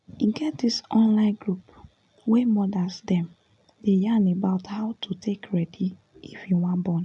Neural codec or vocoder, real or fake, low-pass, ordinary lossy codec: none; real; 10.8 kHz; none